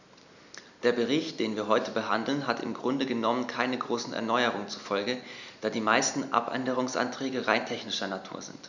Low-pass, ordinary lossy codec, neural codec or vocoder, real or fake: 7.2 kHz; none; none; real